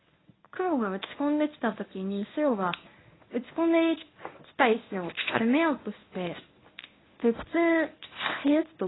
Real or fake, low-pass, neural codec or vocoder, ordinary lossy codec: fake; 7.2 kHz; codec, 24 kHz, 0.9 kbps, WavTokenizer, medium speech release version 1; AAC, 16 kbps